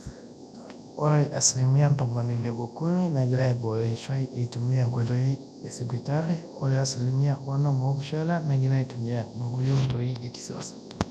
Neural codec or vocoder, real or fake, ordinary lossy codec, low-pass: codec, 24 kHz, 0.9 kbps, WavTokenizer, large speech release; fake; none; none